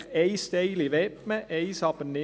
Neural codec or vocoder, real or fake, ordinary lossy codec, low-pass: none; real; none; none